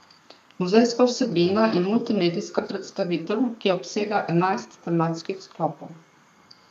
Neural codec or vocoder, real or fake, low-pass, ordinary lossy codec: codec, 32 kHz, 1.9 kbps, SNAC; fake; 14.4 kHz; none